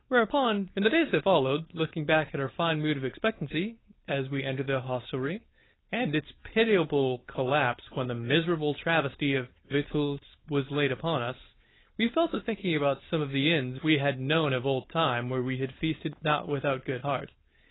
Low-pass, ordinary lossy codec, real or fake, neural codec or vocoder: 7.2 kHz; AAC, 16 kbps; fake; codec, 16 kHz, 4.8 kbps, FACodec